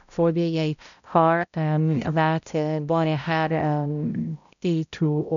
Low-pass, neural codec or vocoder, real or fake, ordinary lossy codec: 7.2 kHz; codec, 16 kHz, 0.5 kbps, X-Codec, HuBERT features, trained on balanced general audio; fake; none